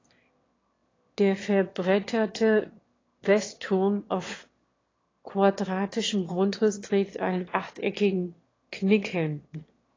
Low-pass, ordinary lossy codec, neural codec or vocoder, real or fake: 7.2 kHz; AAC, 32 kbps; autoencoder, 22.05 kHz, a latent of 192 numbers a frame, VITS, trained on one speaker; fake